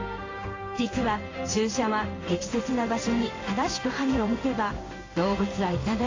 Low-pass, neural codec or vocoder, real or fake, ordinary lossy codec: 7.2 kHz; codec, 16 kHz in and 24 kHz out, 1 kbps, XY-Tokenizer; fake; AAC, 32 kbps